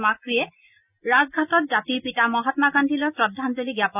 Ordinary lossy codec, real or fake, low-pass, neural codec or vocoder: none; real; 3.6 kHz; none